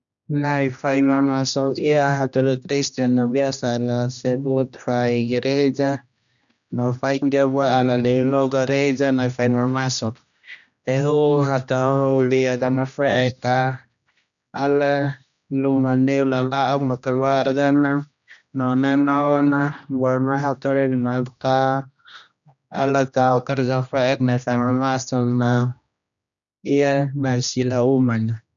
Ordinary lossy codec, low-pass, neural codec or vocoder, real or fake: none; 7.2 kHz; codec, 16 kHz, 1 kbps, X-Codec, HuBERT features, trained on general audio; fake